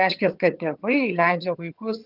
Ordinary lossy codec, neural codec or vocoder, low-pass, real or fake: Opus, 32 kbps; vocoder, 22.05 kHz, 80 mel bands, HiFi-GAN; 5.4 kHz; fake